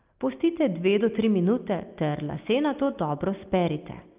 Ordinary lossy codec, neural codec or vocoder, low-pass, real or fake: Opus, 32 kbps; none; 3.6 kHz; real